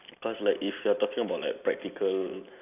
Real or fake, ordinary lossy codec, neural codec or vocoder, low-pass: real; none; none; 3.6 kHz